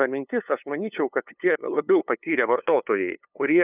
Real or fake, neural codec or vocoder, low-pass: fake; codec, 16 kHz, 2 kbps, FunCodec, trained on LibriTTS, 25 frames a second; 3.6 kHz